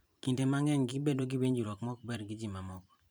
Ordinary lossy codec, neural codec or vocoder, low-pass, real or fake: none; vocoder, 44.1 kHz, 128 mel bands every 512 samples, BigVGAN v2; none; fake